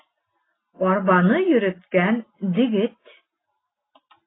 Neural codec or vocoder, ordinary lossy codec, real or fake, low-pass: none; AAC, 16 kbps; real; 7.2 kHz